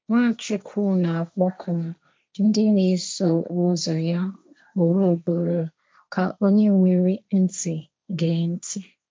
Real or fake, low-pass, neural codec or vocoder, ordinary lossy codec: fake; none; codec, 16 kHz, 1.1 kbps, Voila-Tokenizer; none